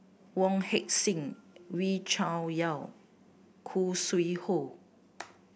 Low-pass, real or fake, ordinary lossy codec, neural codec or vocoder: none; real; none; none